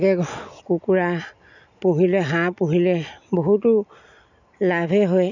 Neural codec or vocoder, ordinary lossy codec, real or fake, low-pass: none; none; real; 7.2 kHz